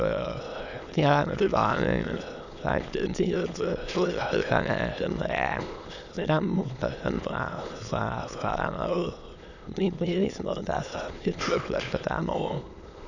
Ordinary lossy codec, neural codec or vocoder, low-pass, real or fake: none; autoencoder, 22.05 kHz, a latent of 192 numbers a frame, VITS, trained on many speakers; 7.2 kHz; fake